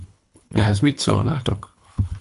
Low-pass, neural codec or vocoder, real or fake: 10.8 kHz; codec, 24 kHz, 3 kbps, HILCodec; fake